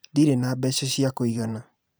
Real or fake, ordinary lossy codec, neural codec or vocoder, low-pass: fake; none; vocoder, 44.1 kHz, 128 mel bands every 512 samples, BigVGAN v2; none